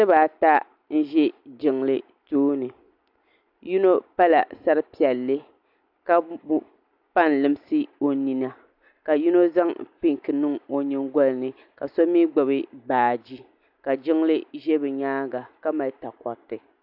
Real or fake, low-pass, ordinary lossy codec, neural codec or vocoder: real; 5.4 kHz; AAC, 48 kbps; none